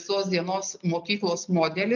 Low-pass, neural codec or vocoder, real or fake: 7.2 kHz; none; real